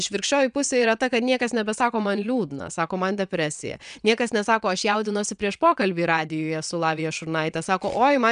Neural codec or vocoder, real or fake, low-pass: vocoder, 22.05 kHz, 80 mel bands, WaveNeXt; fake; 9.9 kHz